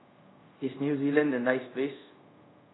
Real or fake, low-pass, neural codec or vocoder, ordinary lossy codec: fake; 7.2 kHz; codec, 24 kHz, 0.5 kbps, DualCodec; AAC, 16 kbps